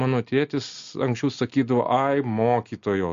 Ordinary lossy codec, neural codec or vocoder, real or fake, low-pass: MP3, 48 kbps; none; real; 7.2 kHz